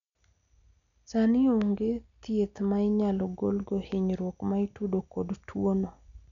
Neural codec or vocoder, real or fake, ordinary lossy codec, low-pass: none; real; none; 7.2 kHz